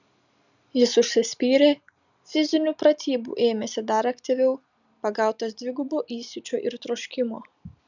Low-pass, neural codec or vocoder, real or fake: 7.2 kHz; none; real